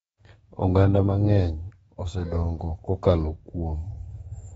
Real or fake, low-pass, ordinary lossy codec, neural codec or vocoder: fake; 19.8 kHz; AAC, 24 kbps; codec, 44.1 kHz, 7.8 kbps, DAC